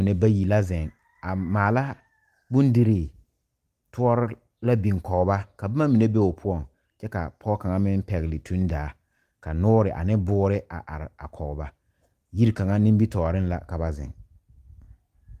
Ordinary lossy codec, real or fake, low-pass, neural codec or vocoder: Opus, 32 kbps; real; 14.4 kHz; none